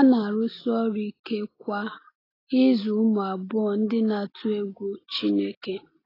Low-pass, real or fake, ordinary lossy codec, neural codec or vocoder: 5.4 kHz; real; AAC, 24 kbps; none